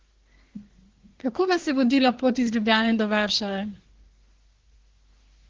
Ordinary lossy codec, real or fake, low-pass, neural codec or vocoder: Opus, 16 kbps; fake; 7.2 kHz; codec, 24 kHz, 1 kbps, SNAC